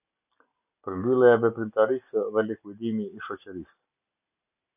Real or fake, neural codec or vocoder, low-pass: real; none; 3.6 kHz